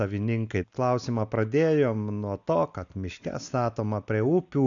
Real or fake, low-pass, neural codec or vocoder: real; 7.2 kHz; none